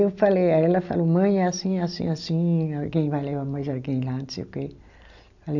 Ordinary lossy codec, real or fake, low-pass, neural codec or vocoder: none; real; 7.2 kHz; none